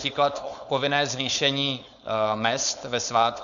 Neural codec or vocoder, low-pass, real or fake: codec, 16 kHz, 4.8 kbps, FACodec; 7.2 kHz; fake